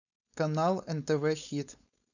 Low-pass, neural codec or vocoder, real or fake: 7.2 kHz; codec, 16 kHz, 4.8 kbps, FACodec; fake